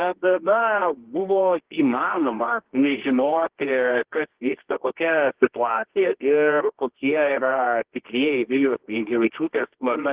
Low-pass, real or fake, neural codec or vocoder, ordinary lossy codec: 3.6 kHz; fake; codec, 24 kHz, 0.9 kbps, WavTokenizer, medium music audio release; Opus, 16 kbps